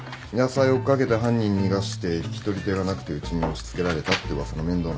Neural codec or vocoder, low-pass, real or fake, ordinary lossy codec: none; none; real; none